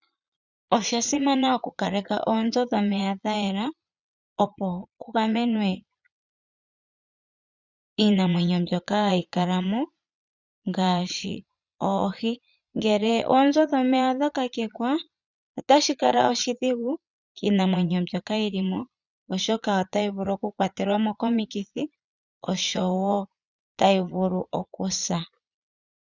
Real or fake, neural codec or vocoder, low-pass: fake; vocoder, 22.05 kHz, 80 mel bands, Vocos; 7.2 kHz